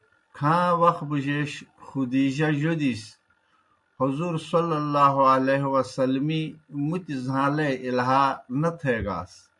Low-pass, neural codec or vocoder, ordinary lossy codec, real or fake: 10.8 kHz; none; MP3, 64 kbps; real